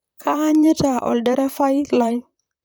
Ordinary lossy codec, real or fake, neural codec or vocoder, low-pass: none; fake; vocoder, 44.1 kHz, 128 mel bands, Pupu-Vocoder; none